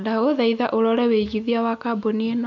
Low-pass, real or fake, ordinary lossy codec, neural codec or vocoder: 7.2 kHz; real; none; none